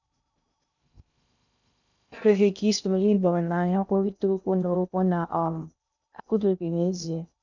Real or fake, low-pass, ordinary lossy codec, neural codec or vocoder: fake; 7.2 kHz; none; codec, 16 kHz in and 24 kHz out, 0.6 kbps, FocalCodec, streaming, 2048 codes